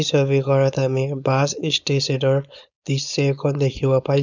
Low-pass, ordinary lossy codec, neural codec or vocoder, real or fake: 7.2 kHz; none; codec, 16 kHz, 4.8 kbps, FACodec; fake